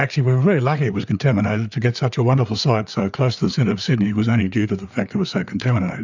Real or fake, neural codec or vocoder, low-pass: fake; codec, 16 kHz, 4 kbps, FreqCodec, larger model; 7.2 kHz